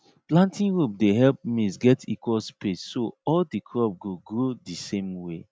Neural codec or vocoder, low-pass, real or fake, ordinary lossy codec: none; none; real; none